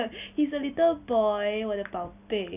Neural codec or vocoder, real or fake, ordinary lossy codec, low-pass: none; real; none; 3.6 kHz